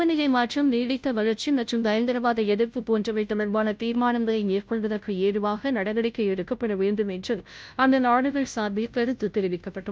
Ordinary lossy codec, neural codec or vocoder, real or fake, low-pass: none; codec, 16 kHz, 0.5 kbps, FunCodec, trained on Chinese and English, 25 frames a second; fake; none